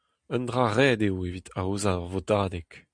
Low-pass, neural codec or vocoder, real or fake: 9.9 kHz; none; real